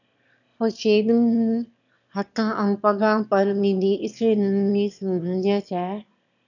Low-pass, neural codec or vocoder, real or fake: 7.2 kHz; autoencoder, 22.05 kHz, a latent of 192 numbers a frame, VITS, trained on one speaker; fake